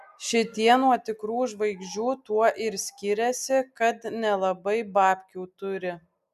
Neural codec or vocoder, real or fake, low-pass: none; real; 14.4 kHz